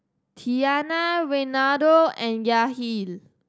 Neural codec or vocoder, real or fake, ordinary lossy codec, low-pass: none; real; none; none